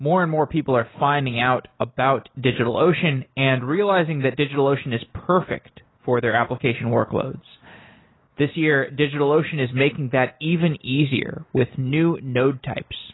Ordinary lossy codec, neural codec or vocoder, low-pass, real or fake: AAC, 16 kbps; none; 7.2 kHz; real